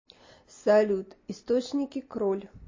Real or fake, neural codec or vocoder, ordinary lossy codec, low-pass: real; none; MP3, 32 kbps; 7.2 kHz